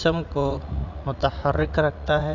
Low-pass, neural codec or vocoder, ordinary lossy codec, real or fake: 7.2 kHz; vocoder, 44.1 kHz, 80 mel bands, Vocos; none; fake